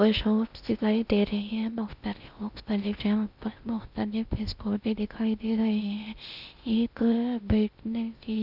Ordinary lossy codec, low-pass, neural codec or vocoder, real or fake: Opus, 64 kbps; 5.4 kHz; codec, 16 kHz in and 24 kHz out, 0.6 kbps, FocalCodec, streaming, 2048 codes; fake